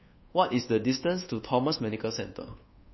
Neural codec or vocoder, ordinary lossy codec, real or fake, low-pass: codec, 24 kHz, 1.2 kbps, DualCodec; MP3, 24 kbps; fake; 7.2 kHz